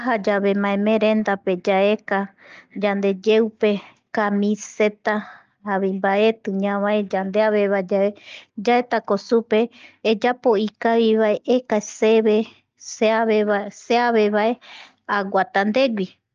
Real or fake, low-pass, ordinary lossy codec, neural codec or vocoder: real; 7.2 kHz; Opus, 24 kbps; none